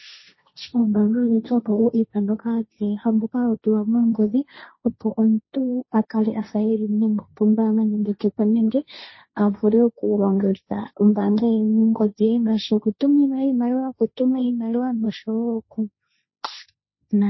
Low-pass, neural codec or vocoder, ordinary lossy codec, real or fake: 7.2 kHz; codec, 16 kHz, 1.1 kbps, Voila-Tokenizer; MP3, 24 kbps; fake